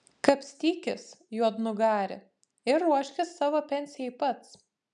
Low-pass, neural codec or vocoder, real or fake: 10.8 kHz; none; real